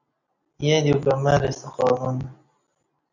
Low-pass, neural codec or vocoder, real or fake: 7.2 kHz; none; real